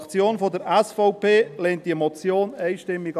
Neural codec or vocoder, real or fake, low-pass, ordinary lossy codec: none; real; 14.4 kHz; none